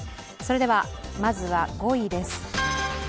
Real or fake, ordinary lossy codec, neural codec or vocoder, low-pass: real; none; none; none